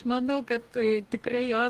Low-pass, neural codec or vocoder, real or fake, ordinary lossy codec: 14.4 kHz; codec, 44.1 kHz, 2.6 kbps, DAC; fake; Opus, 24 kbps